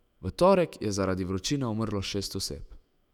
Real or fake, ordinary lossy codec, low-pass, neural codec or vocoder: fake; none; 19.8 kHz; autoencoder, 48 kHz, 128 numbers a frame, DAC-VAE, trained on Japanese speech